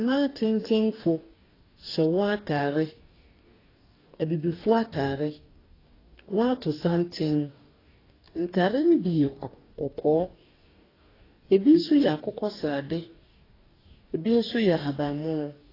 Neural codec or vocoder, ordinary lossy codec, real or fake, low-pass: codec, 44.1 kHz, 2.6 kbps, DAC; AAC, 24 kbps; fake; 5.4 kHz